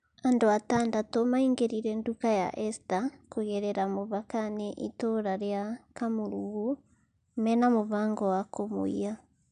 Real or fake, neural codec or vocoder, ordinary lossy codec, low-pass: real; none; none; 9.9 kHz